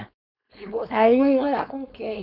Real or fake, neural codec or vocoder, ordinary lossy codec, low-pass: fake; codec, 16 kHz, 4.8 kbps, FACodec; none; 5.4 kHz